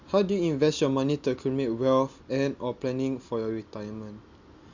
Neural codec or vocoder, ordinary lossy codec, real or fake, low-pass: none; Opus, 64 kbps; real; 7.2 kHz